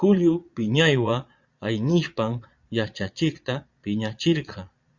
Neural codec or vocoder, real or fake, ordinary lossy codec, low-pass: vocoder, 22.05 kHz, 80 mel bands, Vocos; fake; Opus, 64 kbps; 7.2 kHz